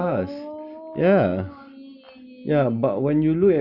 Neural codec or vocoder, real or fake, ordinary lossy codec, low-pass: none; real; AAC, 48 kbps; 5.4 kHz